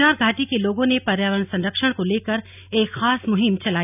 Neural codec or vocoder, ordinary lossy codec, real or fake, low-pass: none; none; real; 3.6 kHz